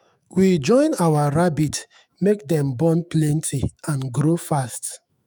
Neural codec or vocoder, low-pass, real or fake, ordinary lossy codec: autoencoder, 48 kHz, 128 numbers a frame, DAC-VAE, trained on Japanese speech; none; fake; none